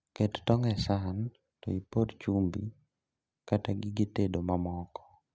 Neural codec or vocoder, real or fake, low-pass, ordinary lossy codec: none; real; none; none